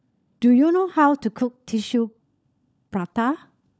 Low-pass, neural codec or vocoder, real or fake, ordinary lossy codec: none; codec, 16 kHz, 16 kbps, FunCodec, trained on LibriTTS, 50 frames a second; fake; none